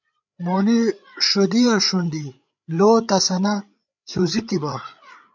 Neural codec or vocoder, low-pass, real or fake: codec, 16 kHz, 8 kbps, FreqCodec, larger model; 7.2 kHz; fake